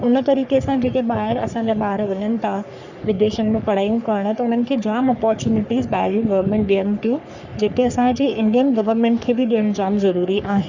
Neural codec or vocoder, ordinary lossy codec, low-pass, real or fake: codec, 44.1 kHz, 3.4 kbps, Pupu-Codec; Opus, 64 kbps; 7.2 kHz; fake